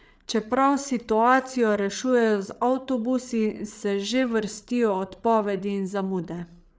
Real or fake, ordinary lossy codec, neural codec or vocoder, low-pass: fake; none; codec, 16 kHz, 8 kbps, FreqCodec, larger model; none